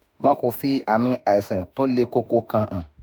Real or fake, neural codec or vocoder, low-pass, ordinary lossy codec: fake; autoencoder, 48 kHz, 32 numbers a frame, DAC-VAE, trained on Japanese speech; none; none